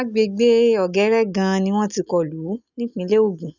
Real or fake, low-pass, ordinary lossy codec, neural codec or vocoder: real; 7.2 kHz; none; none